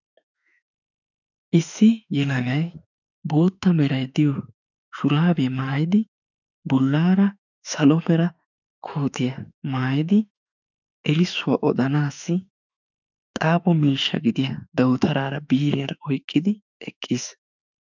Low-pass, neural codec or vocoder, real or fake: 7.2 kHz; autoencoder, 48 kHz, 32 numbers a frame, DAC-VAE, trained on Japanese speech; fake